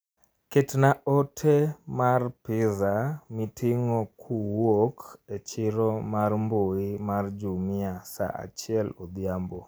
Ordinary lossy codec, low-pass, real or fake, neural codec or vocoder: none; none; real; none